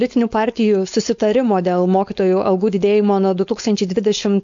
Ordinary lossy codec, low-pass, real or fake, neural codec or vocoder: MP3, 48 kbps; 7.2 kHz; fake; codec, 16 kHz, 4.8 kbps, FACodec